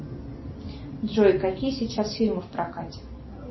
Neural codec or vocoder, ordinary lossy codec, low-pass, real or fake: none; MP3, 24 kbps; 7.2 kHz; real